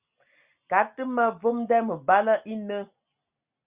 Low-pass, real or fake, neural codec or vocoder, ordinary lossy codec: 3.6 kHz; real; none; Opus, 64 kbps